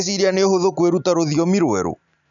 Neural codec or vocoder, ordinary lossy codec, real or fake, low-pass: none; none; real; 7.2 kHz